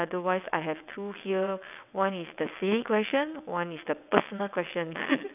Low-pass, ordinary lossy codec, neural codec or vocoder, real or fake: 3.6 kHz; none; vocoder, 22.05 kHz, 80 mel bands, WaveNeXt; fake